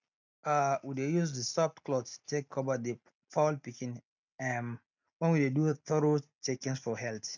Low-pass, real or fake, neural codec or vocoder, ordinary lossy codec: 7.2 kHz; real; none; none